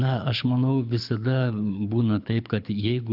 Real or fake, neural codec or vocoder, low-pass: fake; codec, 24 kHz, 6 kbps, HILCodec; 5.4 kHz